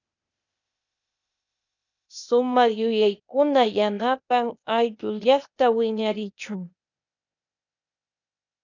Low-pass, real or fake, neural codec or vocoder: 7.2 kHz; fake; codec, 16 kHz, 0.8 kbps, ZipCodec